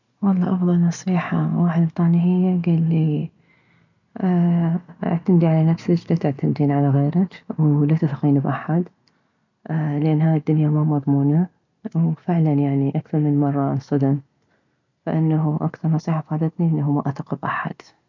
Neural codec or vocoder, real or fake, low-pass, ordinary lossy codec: none; real; 7.2 kHz; none